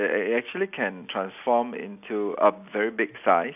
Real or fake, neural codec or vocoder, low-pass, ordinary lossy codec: real; none; 3.6 kHz; none